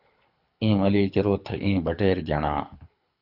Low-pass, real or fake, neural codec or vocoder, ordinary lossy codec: 5.4 kHz; fake; codec, 24 kHz, 6 kbps, HILCodec; AAC, 48 kbps